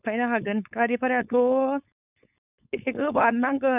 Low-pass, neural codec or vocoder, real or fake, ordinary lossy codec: 3.6 kHz; codec, 16 kHz, 4.8 kbps, FACodec; fake; none